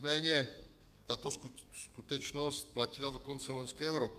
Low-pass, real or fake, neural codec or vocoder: 14.4 kHz; fake; codec, 32 kHz, 1.9 kbps, SNAC